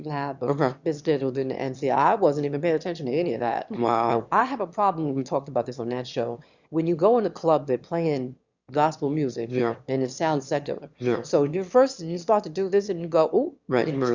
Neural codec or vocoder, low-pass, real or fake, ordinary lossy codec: autoencoder, 22.05 kHz, a latent of 192 numbers a frame, VITS, trained on one speaker; 7.2 kHz; fake; Opus, 64 kbps